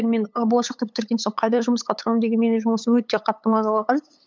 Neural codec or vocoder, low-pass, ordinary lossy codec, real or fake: codec, 16 kHz, 8 kbps, FunCodec, trained on LibriTTS, 25 frames a second; none; none; fake